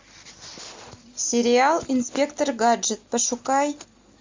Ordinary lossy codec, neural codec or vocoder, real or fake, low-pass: MP3, 64 kbps; none; real; 7.2 kHz